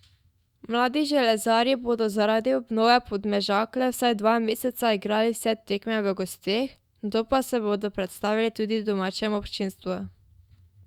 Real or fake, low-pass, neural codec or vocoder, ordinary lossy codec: fake; 19.8 kHz; autoencoder, 48 kHz, 128 numbers a frame, DAC-VAE, trained on Japanese speech; Opus, 64 kbps